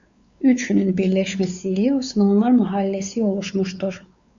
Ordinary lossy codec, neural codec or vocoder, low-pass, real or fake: Opus, 64 kbps; codec, 16 kHz, 4 kbps, X-Codec, WavLM features, trained on Multilingual LibriSpeech; 7.2 kHz; fake